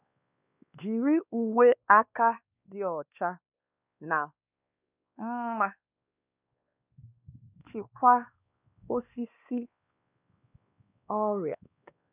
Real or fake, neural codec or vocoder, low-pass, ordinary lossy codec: fake; codec, 16 kHz, 2 kbps, X-Codec, WavLM features, trained on Multilingual LibriSpeech; 3.6 kHz; none